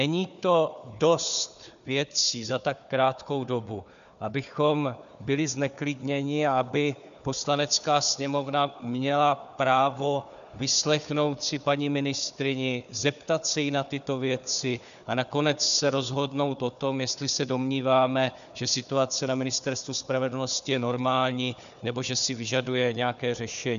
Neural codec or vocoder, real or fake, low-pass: codec, 16 kHz, 4 kbps, FunCodec, trained on Chinese and English, 50 frames a second; fake; 7.2 kHz